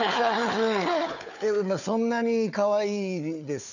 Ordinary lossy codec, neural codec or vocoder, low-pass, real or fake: none; codec, 16 kHz, 4 kbps, FunCodec, trained on Chinese and English, 50 frames a second; 7.2 kHz; fake